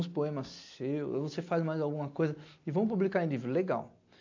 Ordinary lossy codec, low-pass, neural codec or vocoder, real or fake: AAC, 48 kbps; 7.2 kHz; none; real